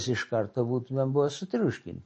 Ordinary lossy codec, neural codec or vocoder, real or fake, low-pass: MP3, 32 kbps; none; real; 9.9 kHz